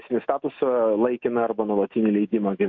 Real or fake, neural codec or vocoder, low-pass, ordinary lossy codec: fake; autoencoder, 48 kHz, 128 numbers a frame, DAC-VAE, trained on Japanese speech; 7.2 kHz; MP3, 48 kbps